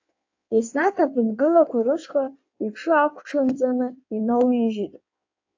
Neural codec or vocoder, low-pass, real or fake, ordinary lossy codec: codec, 16 kHz in and 24 kHz out, 1.1 kbps, FireRedTTS-2 codec; 7.2 kHz; fake; AAC, 48 kbps